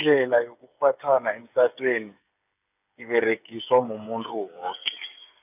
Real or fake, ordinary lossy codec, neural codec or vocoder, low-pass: fake; none; codec, 16 kHz, 8 kbps, FreqCodec, smaller model; 3.6 kHz